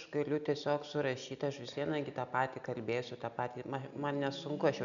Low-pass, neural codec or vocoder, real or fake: 7.2 kHz; none; real